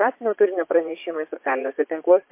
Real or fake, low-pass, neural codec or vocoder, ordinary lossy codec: fake; 3.6 kHz; vocoder, 22.05 kHz, 80 mel bands, Vocos; MP3, 24 kbps